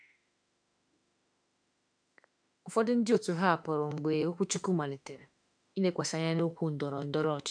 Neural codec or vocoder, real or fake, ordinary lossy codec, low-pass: autoencoder, 48 kHz, 32 numbers a frame, DAC-VAE, trained on Japanese speech; fake; none; 9.9 kHz